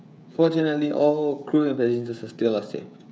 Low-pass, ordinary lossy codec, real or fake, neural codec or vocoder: none; none; fake; codec, 16 kHz, 16 kbps, FreqCodec, smaller model